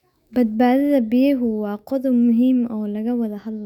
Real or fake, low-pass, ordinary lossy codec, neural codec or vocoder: fake; 19.8 kHz; none; autoencoder, 48 kHz, 128 numbers a frame, DAC-VAE, trained on Japanese speech